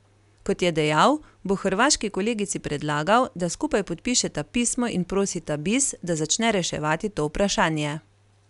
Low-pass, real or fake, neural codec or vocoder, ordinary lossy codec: 10.8 kHz; real; none; none